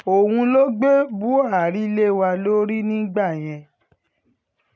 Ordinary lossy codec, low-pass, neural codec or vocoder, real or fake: none; none; none; real